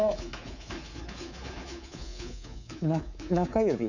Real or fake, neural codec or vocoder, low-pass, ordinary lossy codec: fake; codec, 24 kHz, 3.1 kbps, DualCodec; 7.2 kHz; none